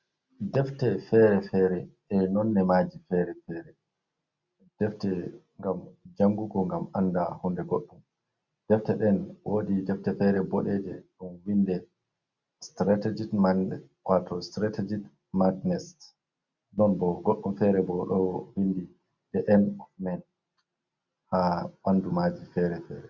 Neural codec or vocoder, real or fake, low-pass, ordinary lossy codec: none; real; 7.2 kHz; Opus, 64 kbps